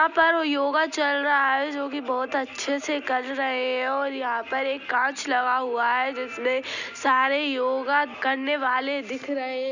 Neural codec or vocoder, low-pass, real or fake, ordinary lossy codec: none; 7.2 kHz; real; none